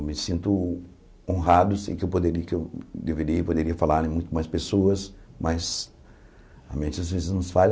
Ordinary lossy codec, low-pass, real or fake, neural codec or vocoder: none; none; real; none